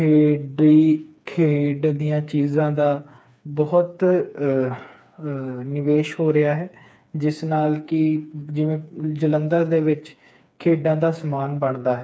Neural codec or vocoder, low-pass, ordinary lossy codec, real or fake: codec, 16 kHz, 4 kbps, FreqCodec, smaller model; none; none; fake